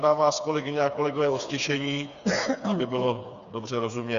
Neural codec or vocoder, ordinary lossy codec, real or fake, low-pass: codec, 16 kHz, 4 kbps, FreqCodec, smaller model; Opus, 64 kbps; fake; 7.2 kHz